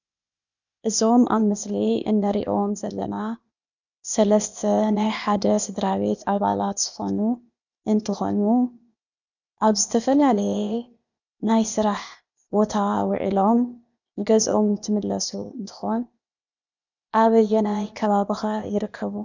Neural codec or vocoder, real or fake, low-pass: codec, 16 kHz, 0.8 kbps, ZipCodec; fake; 7.2 kHz